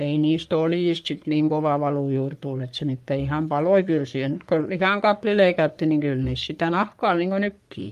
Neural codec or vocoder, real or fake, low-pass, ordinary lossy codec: codec, 44.1 kHz, 3.4 kbps, Pupu-Codec; fake; 14.4 kHz; Opus, 32 kbps